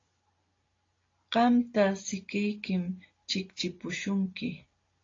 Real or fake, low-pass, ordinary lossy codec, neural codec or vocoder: real; 7.2 kHz; AAC, 32 kbps; none